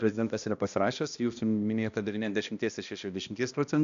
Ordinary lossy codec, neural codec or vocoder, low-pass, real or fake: AAC, 96 kbps; codec, 16 kHz, 1 kbps, X-Codec, HuBERT features, trained on balanced general audio; 7.2 kHz; fake